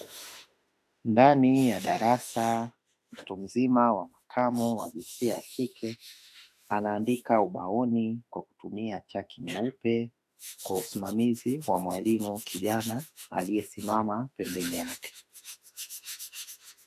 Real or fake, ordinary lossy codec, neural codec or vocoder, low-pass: fake; AAC, 96 kbps; autoencoder, 48 kHz, 32 numbers a frame, DAC-VAE, trained on Japanese speech; 14.4 kHz